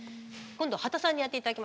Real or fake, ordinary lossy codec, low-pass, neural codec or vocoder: real; none; none; none